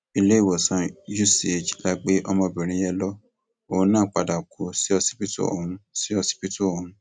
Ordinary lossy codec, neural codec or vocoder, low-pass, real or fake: none; none; 9.9 kHz; real